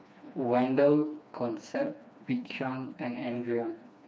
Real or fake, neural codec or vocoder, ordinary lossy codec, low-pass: fake; codec, 16 kHz, 2 kbps, FreqCodec, smaller model; none; none